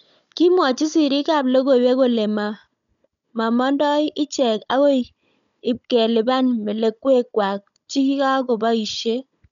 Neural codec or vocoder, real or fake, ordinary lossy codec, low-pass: codec, 16 kHz, 16 kbps, FunCodec, trained on Chinese and English, 50 frames a second; fake; none; 7.2 kHz